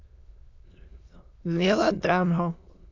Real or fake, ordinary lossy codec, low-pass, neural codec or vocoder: fake; AAC, 48 kbps; 7.2 kHz; autoencoder, 22.05 kHz, a latent of 192 numbers a frame, VITS, trained on many speakers